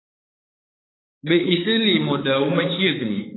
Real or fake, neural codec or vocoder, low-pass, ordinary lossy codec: fake; codec, 16 kHz, 4 kbps, X-Codec, HuBERT features, trained on balanced general audio; 7.2 kHz; AAC, 16 kbps